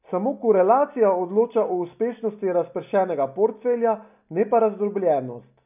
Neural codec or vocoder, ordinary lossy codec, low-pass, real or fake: none; none; 3.6 kHz; real